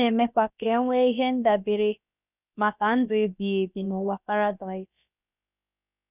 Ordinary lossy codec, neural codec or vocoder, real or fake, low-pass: none; codec, 16 kHz, about 1 kbps, DyCAST, with the encoder's durations; fake; 3.6 kHz